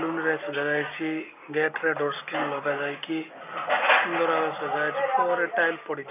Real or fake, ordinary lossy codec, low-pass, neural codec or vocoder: real; none; 3.6 kHz; none